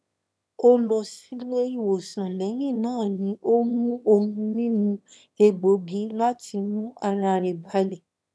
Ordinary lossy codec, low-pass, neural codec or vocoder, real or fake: none; none; autoencoder, 22.05 kHz, a latent of 192 numbers a frame, VITS, trained on one speaker; fake